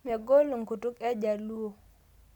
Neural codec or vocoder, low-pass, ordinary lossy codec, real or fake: none; 19.8 kHz; none; real